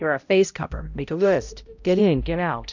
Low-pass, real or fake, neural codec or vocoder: 7.2 kHz; fake; codec, 16 kHz, 0.5 kbps, X-Codec, HuBERT features, trained on balanced general audio